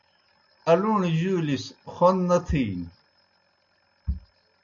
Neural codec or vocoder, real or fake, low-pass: none; real; 7.2 kHz